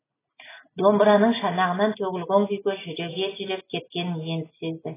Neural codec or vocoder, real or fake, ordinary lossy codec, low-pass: none; real; AAC, 16 kbps; 3.6 kHz